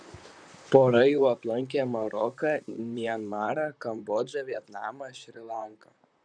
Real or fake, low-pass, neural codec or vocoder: fake; 9.9 kHz; vocoder, 44.1 kHz, 128 mel bands, Pupu-Vocoder